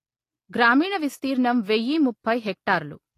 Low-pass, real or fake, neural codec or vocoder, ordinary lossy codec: 14.4 kHz; real; none; AAC, 48 kbps